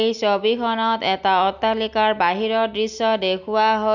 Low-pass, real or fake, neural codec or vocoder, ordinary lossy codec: 7.2 kHz; real; none; none